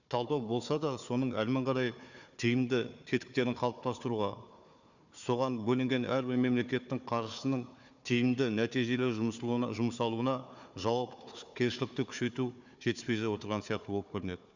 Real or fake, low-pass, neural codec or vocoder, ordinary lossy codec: fake; 7.2 kHz; codec, 16 kHz, 4 kbps, FunCodec, trained on Chinese and English, 50 frames a second; none